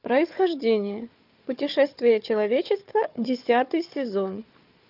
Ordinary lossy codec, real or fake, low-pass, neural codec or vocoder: Opus, 24 kbps; fake; 5.4 kHz; codec, 16 kHz, 4 kbps, FunCodec, trained on Chinese and English, 50 frames a second